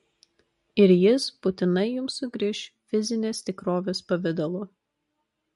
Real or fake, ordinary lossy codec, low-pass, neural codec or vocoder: real; MP3, 48 kbps; 14.4 kHz; none